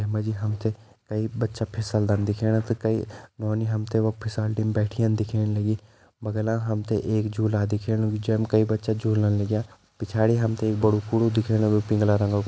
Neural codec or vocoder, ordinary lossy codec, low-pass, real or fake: none; none; none; real